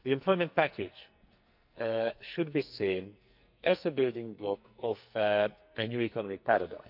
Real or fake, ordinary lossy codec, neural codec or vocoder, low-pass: fake; none; codec, 44.1 kHz, 2.6 kbps, SNAC; 5.4 kHz